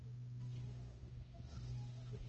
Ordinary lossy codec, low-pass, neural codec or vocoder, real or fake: Opus, 24 kbps; 7.2 kHz; codec, 16 kHz, 0.5 kbps, X-Codec, HuBERT features, trained on balanced general audio; fake